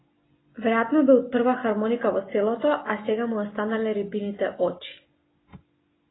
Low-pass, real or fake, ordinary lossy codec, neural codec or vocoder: 7.2 kHz; real; AAC, 16 kbps; none